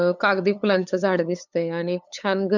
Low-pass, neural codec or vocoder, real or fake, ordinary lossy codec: 7.2 kHz; codec, 16 kHz, 8 kbps, FunCodec, trained on LibriTTS, 25 frames a second; fake; none